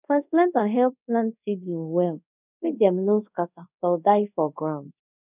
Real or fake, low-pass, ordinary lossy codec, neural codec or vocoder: fake; 3.6 kHz; none; codec, 24 kHz, 0.5 kbps, DualCodec